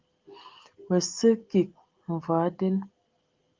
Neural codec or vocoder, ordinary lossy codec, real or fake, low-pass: none; Opus, 32 kbps; real; 7.2 kHz